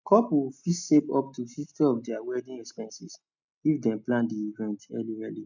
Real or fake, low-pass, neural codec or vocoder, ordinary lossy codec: real; 7.2 kHz; none; none